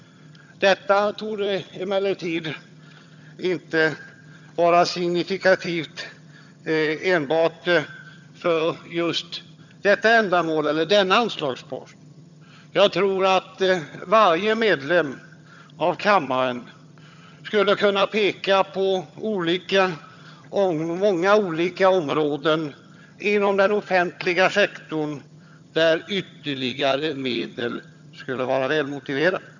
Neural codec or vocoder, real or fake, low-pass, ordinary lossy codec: vocoder, 22.05 kHz, 80 mel bands, HiFi-GAN; fake; 7.2 kHz; none